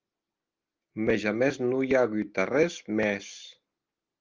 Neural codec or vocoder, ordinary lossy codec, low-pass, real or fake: none; Opus, 32 kbps; 7.2 kHz; real